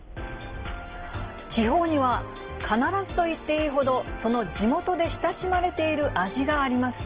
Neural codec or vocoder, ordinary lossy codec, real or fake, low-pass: none; Opus, 16 kbps; real; 3.6 kHz